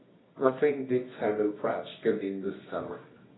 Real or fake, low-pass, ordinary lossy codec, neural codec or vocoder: fake; 7.2 kHz; AAC, 16 kbps; codec, 24 kHz, 0.9 kbps, WavTokenizer, medium music audio release